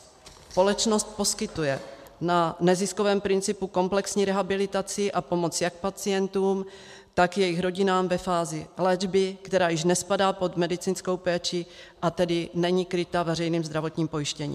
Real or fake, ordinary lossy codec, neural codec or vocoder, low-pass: real; MP3, 96 kbps; none; 14.4 kHz